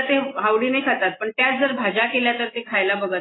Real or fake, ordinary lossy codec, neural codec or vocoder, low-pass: real; AAC, 16 kbps; none; 7.2 kHz